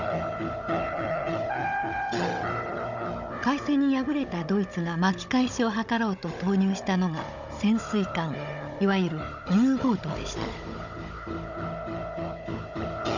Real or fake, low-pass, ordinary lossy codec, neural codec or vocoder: fake; 7.2 kHz; none; codec, 16 kHz, 16 kbps, FunCodec, trained on Chinese and English, 50 frames a second